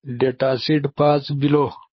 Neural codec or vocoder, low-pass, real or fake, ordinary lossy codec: codec, 24 kHz, 6 kbps, HILCodec; 7.2 kHz; fake; MP3, 24 kbps